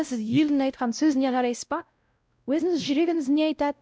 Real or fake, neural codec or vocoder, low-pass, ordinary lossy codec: fake; codec, 16 kHz, 0.5 kbps, X-Codec, WavLM features, trained on Multilingual LibriSpeech; none; none